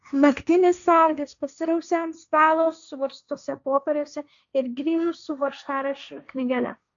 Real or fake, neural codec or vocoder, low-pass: fake; codec, 16 kHz, 1.1 kbps, Voila-Tokenizer; 7.2 kHz